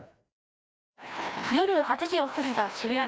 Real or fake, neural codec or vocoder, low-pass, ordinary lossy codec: fake; codec, 16 kHz, 1 kbps, FreqCodec, larger model; none; none